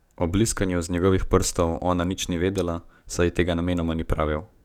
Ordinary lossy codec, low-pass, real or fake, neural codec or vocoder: none; 19.8 kHz; fake; codec, 44.1 kHz, 7.8 kbps, DAC